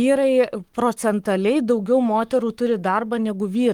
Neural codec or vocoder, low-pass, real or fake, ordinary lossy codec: codec, 44.1 kHz, 7.8 kbps, Pupu-Codec; 19.8 kHz; fake; Opus, 32 kbps